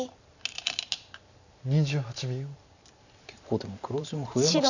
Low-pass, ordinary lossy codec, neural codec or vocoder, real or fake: 7.2 kHz; none; vocoder, 44.1 kHz, 128 mel bands every 512 samples, BigVGAN v2; fake